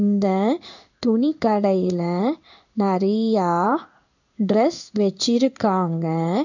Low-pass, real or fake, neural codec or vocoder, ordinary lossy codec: 7.2 kHz; fake; codec, 16 kHz in and 24 kHz out, 1 kbps, XY-Tokenizer; none